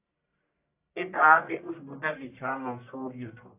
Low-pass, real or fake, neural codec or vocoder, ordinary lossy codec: 3.6 kHz; fake; codec, 44.1 kHz, 1.7 kbps, Pupu-Codec; AAC, 32 kbps